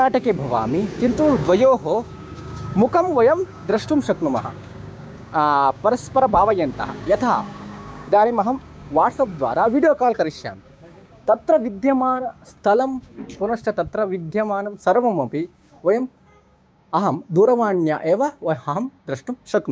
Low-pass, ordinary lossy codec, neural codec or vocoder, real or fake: none; none; codec, 16 kHz, 6 kbps, DAC; fake